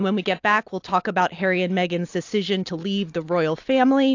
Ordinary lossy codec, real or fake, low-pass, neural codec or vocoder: AAC, 48 kbps; fake; 7.2 kHz; vocoder, 44.1 kHz, 128 mel bands every 256 samples, BigVGAN v2